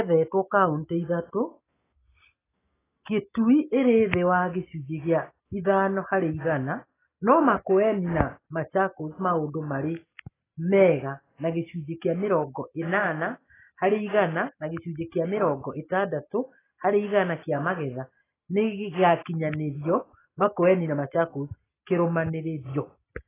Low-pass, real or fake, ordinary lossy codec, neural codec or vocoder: 3.6 kHz; real; AAC, 16 kbps; none